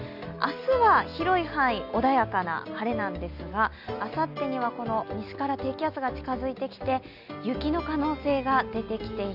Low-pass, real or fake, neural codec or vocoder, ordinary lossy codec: 5.4 kHz; real; none; none